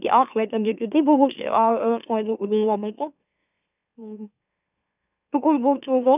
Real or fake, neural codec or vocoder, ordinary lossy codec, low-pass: fake; autoencoder, 44.1 kHz, a latent of 192 numbers a frame, MeloTTS; none; 3.6 kHz